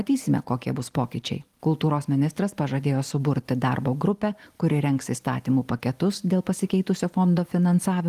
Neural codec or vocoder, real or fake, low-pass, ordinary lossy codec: none; real; 14.4 kHz; Opus, 24 kbps